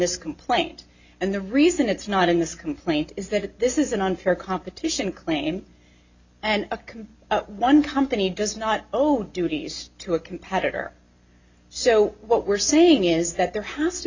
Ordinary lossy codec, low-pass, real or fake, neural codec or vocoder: Opus, 64 kbps; 7.2 kHz; real; none